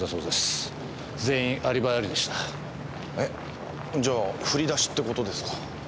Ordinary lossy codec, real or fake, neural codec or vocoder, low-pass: none; real; none; none